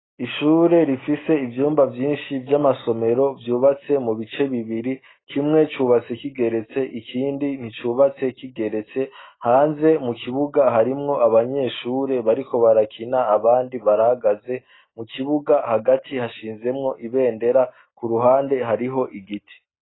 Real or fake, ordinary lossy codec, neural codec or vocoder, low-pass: real; AAC, 16 kbps; none; 7.2 kHz